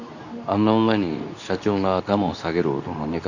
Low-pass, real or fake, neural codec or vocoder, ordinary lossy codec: 7.2 kHz; fake; codec, 24 kHz, 0.9 kbps, WavTokenizer, medium speech release version 2; none